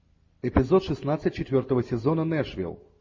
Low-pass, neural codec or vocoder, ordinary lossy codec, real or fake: 7.2 kHz; none; MP3, 32 kbps; real